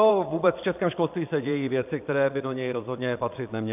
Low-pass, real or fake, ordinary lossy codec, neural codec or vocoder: 3.6 kHz; fake; AAC, 32 kbps; vocoder, 22.05 kHz, 80 mel bands, Vocos